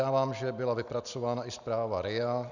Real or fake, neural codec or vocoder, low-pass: real; none; 7.2 kHz